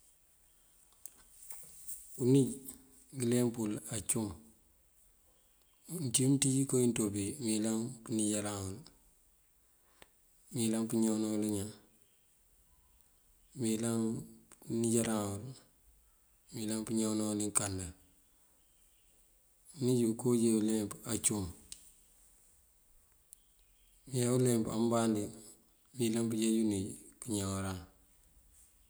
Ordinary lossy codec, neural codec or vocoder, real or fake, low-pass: none; none; real; none